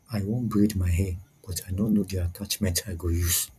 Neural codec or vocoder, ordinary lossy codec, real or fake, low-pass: vocoder, 44.1 kHz, 128 mel bands every 256 samples, BigVGAN v2; none; fake; 14.4 kHz